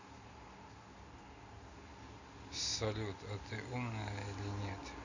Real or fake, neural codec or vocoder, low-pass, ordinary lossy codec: real; none; 7.2 kHz; none